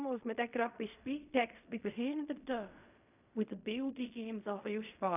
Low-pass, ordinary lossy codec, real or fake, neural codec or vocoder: 3.6 kHz; none; fake; codec, 16 kHz in and 24 kHz out, 0.4 kbps, LongCat-Audio-Codec, fine tuned four codebook decoder